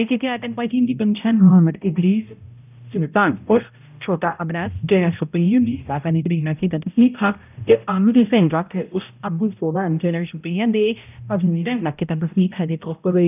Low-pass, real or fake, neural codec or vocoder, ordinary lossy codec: 3.6 kHz; fake; codec, 16 kHz, 0.5 kbps, X-Codec, HuBERT features, trained on balanced general audio; none